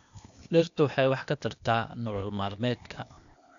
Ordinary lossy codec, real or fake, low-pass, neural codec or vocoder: MP3, 96 kbps; fake; 7.2 kHz; codec, 16 kHz, 0.8 kbps, ZipCodec